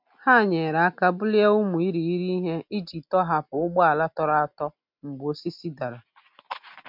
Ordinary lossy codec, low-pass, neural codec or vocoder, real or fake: MP3, 48 kbps; 5.4 kHz; none; real